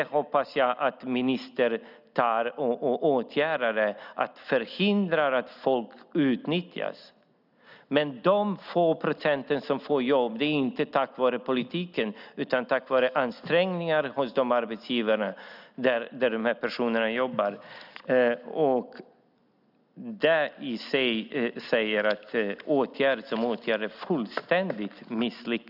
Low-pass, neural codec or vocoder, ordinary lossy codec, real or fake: 5.4 kHz; none; none; real